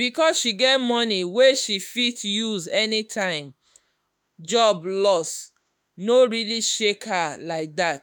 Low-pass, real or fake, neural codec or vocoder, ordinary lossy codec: none; fake; autoencoder, 48 kHz, 32 numbers a frame, DAC-VAE, trained on Japanese speech; none